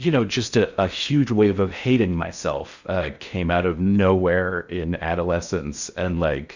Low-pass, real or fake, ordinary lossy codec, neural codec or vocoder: 7.2 kHz; fake; Opus, 64 kbps; codec, 16 kHz in and 24 kHz out, 0.6 kbps, FocalCodec, streaming, 4096 codes